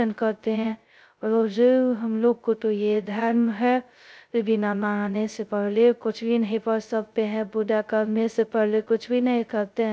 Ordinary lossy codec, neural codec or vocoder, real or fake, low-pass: none; codec, 16 kHz, 0.2 kbps, FocalCodec; fake; none